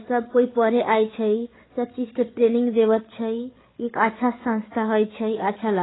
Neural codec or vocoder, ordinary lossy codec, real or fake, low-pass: codec, 16 kHz, 8 kbps, FreqCodec, larger model; AAC, 16 kbps; fake; 7.2 kHz